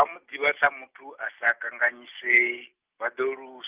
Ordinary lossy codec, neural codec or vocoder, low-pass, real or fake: Opus, 32 kbps; none; 3.6 kHz; real